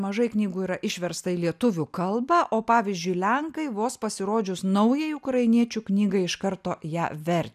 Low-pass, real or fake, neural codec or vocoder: 14.4 kHz; real; none